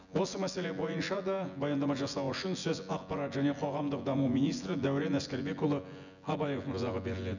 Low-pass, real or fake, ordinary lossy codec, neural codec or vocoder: 7.2 kHz; fake; none; vocoder, 24 kHz, 100 mel bands, Vocos